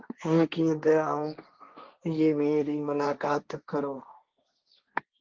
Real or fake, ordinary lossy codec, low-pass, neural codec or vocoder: fake; Opus, 32 kbps; 7.2 kHz; codec, 16 kHz, 1.1 kbps, Voila-Tokenizer